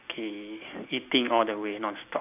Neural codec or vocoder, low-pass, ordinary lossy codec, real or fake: none; 3.6 kHz; AAC, 32 kbps; real